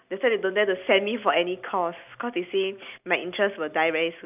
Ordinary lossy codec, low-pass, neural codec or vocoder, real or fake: none; 3.6 kHz; none; real